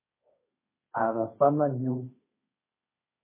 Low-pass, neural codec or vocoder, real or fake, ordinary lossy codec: 3.6 kHz; codec, 16 kHz, 1.1 kbps, Voila-Tokenizer; fake; MP3, 16 kbps